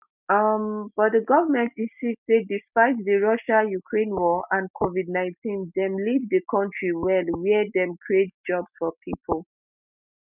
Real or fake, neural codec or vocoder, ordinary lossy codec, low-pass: real; none; none; 3.6 kHz